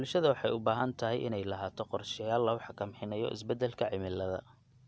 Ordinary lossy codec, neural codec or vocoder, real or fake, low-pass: none; none; real; none